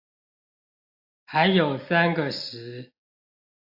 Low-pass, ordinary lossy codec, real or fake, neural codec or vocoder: 5.4 kHz; AAC, 32 kbps; real; none